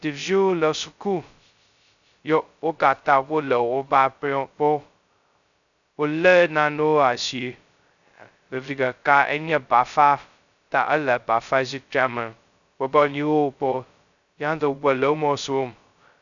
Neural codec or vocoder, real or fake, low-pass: codec, 16 kHz, 0.2 kbps, FocalCodec; fake; 7.2 kHz